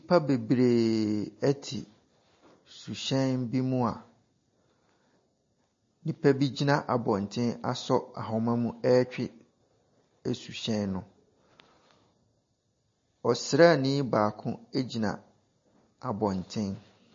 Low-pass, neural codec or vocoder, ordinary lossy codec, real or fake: 7.2 kHz; none; MP3, 32 kbps; real